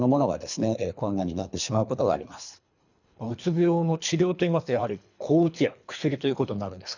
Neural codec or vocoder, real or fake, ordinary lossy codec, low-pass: codec, 24 kHz, 3 kbps, HILCodec; fake; none; 7.2 kHz